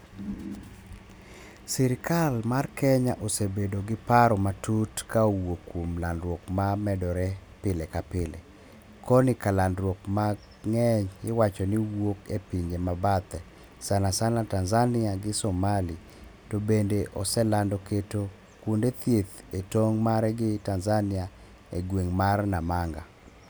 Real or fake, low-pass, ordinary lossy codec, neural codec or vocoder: real; none; none; none